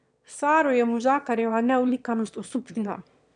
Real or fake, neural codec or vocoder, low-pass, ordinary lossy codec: fake; autoencoder, 22.05 kHz, a latent of 192 numbers a frame, VITS, trained on one speaker; 9.9 kHz; none